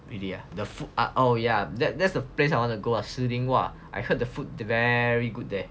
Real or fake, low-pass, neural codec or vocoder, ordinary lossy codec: real; none; none; none